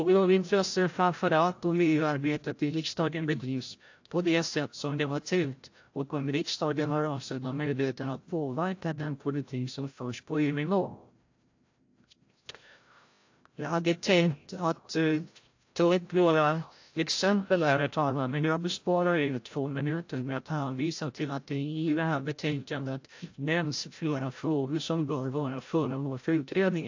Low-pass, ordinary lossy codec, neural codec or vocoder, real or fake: 7.2 kHz; AAC, 48 kbps; codec, 16 kHz, 0.5 kbps, FreqCodec, larger model; fake